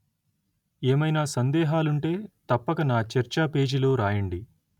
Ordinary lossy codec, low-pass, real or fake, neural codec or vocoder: none; 19.8 kHz; real; none